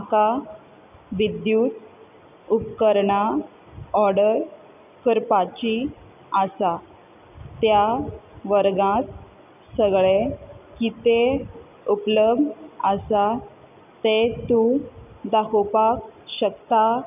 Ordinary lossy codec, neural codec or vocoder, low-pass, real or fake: none; none; 3.6 kHz; real